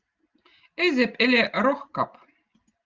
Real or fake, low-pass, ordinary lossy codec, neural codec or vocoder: real; 7.2 kHz; Opus, 24 kbps; none